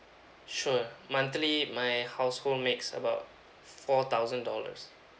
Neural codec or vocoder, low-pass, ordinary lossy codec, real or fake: none; none; none; real